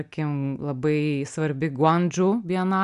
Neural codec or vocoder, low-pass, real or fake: none; 10.8 kHz; real